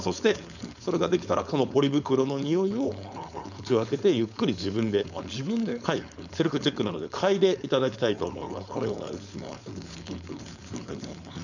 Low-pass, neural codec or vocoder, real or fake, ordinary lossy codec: 7.2 kHz; codec, 16 kHz, 4.8 kbps, FACodec; fake; none